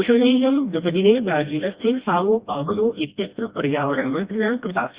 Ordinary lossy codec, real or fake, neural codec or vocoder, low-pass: Opus, 64 kbps; fake; codec, 16 kHz, 1 kbps, FreqCodec, smaller model; 3.6 kHz